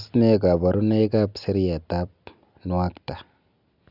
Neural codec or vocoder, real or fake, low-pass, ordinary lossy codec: none; real; 5.4 kHz; none